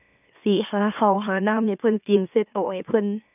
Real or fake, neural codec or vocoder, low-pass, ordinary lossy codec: fake; autoencoder, 44.1 kHz, a latent of 192 numbers a frame, MeloTTS; 3.6 kHz; none